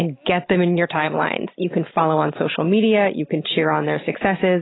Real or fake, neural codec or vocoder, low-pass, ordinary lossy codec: real; none; 7.2 kHz; AAC, 16 kbps